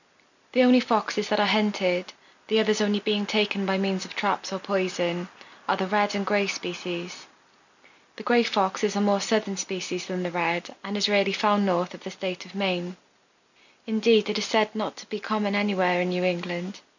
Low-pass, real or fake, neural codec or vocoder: 7.2 kHz; real; none